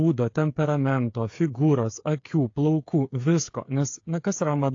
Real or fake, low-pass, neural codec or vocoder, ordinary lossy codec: fake; 7.2 kHz; codec, 16 kHz, 8 kbps, FreqCodec, smaller model; AAC, 48 kbps